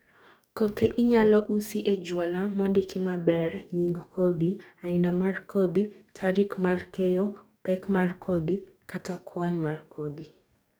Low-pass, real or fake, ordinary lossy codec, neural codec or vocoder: none; fake; none; codec, 44.1 kHz, 2.6 kbps, DAC